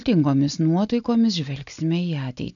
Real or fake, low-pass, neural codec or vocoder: real; 7.2 kHz; none